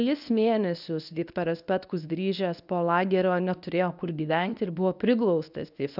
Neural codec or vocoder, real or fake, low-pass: codec, 24 kHz, 0.9 kbps, WavTokenizer, medium speech release version 1; fake; 5.4 kHz